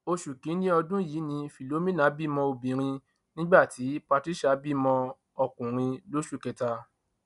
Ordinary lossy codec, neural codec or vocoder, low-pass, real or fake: none; none; 10.8 kHz; real